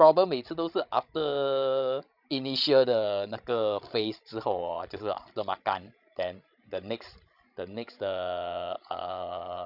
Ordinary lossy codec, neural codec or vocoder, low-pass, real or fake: none; codec, 16 kHz, 16 kbps, FreqCodec, larger model; 5.4 kHz; fake